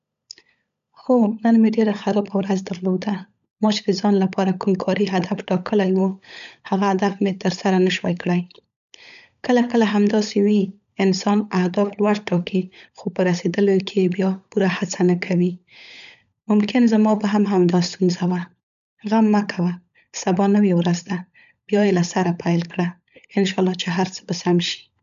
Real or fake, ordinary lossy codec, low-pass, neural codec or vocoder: fake; none; 7.2 kHz; codec, 16 kHz, 16 kbps, FunCodec, trained on LibriTTS, 50 frames a second